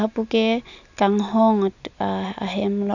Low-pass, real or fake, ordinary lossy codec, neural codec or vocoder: 7.2 kHz; real; none; none